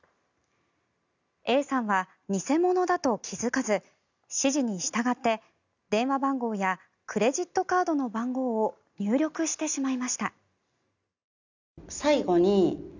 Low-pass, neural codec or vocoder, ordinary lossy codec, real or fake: 7.2 kHz; none; none; real